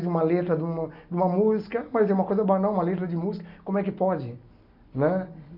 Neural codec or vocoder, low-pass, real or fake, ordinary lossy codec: none; 5.4 kHz; real; none